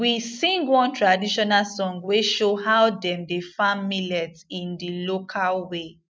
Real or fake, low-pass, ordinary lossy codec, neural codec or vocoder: real; none; none; none